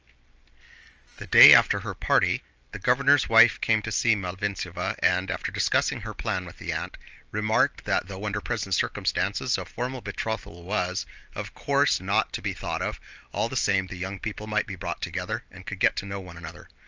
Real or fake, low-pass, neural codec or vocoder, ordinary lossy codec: real; 7.2 kHz; none; Opus, 24 kbps